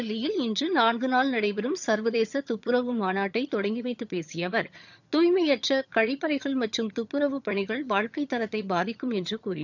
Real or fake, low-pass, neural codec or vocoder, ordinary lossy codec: fake; 7.2 kHz; vocoder, 22.05 kHz, 80 mel bands, HiFi-GAN; none